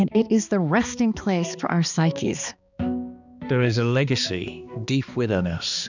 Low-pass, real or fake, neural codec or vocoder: 7.2 kHz; fake; codec, 16 kHz, 2 kbps, X-Codec, HuBERT features, trained on balanced general audio